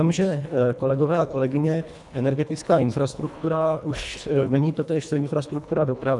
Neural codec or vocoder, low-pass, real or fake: codec, 24 kHz, 1.5 kbps, HILCodec; 10.8 kHz; fake